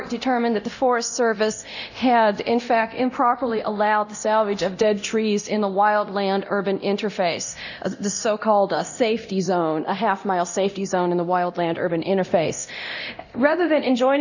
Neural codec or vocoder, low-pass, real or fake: codec, 24 kHz, 0.9 kbps, DualCodec; 7.2 kHz; fake